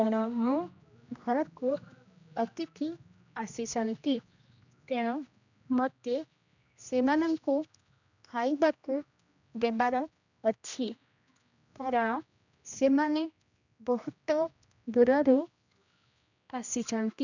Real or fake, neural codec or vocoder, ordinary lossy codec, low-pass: fake; codec, 16 kHz, 1 kbps, X-Codec, HuBERT features, trained on general audio; none; 7.2 kHz